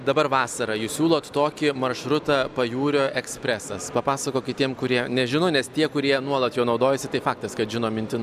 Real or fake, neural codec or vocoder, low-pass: real; none; 14.4 kHz